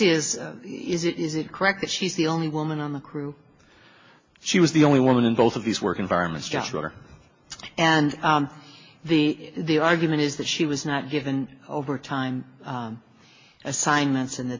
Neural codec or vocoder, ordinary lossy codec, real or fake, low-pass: none; MP3, 32 kbps; real; 7.2 kHz